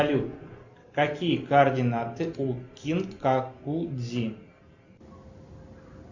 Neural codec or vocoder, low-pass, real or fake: none; 7.2 kHz; real